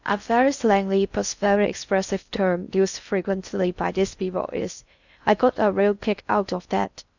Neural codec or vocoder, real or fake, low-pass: codec, 16 kHz in and 24 kHz out, 0.6 kbps, FocalCodec, streaming, 4096 codes; fake; 7.2 kHz